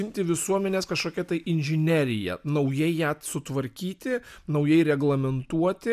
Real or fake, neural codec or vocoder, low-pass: real; none; 14.4 kHz